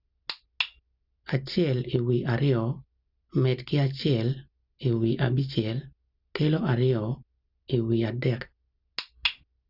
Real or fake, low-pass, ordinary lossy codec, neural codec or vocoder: real; 5.4 kHz; none; none